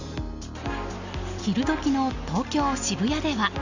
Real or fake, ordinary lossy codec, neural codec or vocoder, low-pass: real; none; none; 7.2 kHz